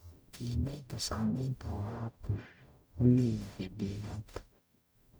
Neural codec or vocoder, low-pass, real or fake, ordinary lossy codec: codec, 44.1 kHz, 0.9 kbps, DAC; none; fake; none